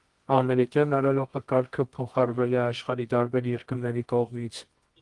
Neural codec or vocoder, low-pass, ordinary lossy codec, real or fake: codec, 24 kHz, 0.9 kbps, WavTokenizer, medium music audio release; 10.8 kHz; Opus, 24 kbps; fake